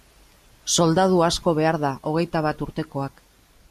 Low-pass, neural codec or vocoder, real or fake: 14.4 kHz; none; real